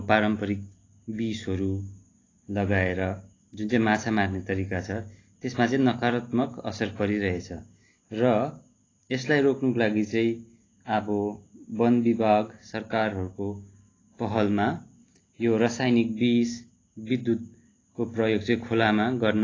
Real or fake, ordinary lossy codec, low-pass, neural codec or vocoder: real; AAC, 32 kbps; 7.2 kHz; none